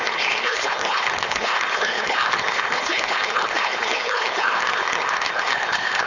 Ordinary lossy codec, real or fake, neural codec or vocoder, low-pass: none; fake; codec, 16 kHz, 4.8 kbps, FACodec; 7.2 kHz